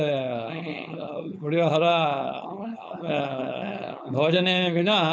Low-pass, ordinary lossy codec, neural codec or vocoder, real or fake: none; none; codec, 16 kHz, 4.8 kbps, FACodec; fake